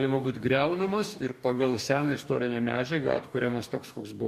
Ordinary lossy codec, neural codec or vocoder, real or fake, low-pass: MP3, 64 kbps; codec, 44.1 kHz, 2.6 kbps, DAC; fake; 14.4 kHz